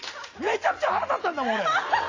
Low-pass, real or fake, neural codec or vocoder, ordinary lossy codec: 7.2 kHz; real; none; AAC, 32 kbps